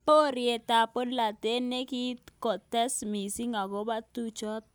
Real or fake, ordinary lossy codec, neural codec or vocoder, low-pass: real; none; none; none